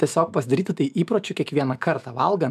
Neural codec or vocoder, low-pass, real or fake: autoencoder, 48 kHz, 128 numbers a frame, DAC-VAE, trained on Japanese speech; 14.4 kHz; fake